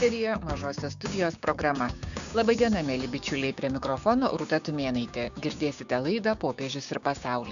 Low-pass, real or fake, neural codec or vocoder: 7.2 kHz; fake; codec, 16 kHz, 6 kbps, DAC